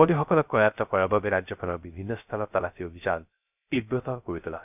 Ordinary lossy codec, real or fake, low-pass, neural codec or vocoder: none; fake; 3.6 kHz; codec, 16 kHz, 0.3 kbps, FocalCodec